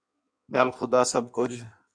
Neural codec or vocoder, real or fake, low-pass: codec, 16 kHz in and 24 kHz out, 1.1 kbps, FireRedTTS-2 codec; fake; 9.9 kHz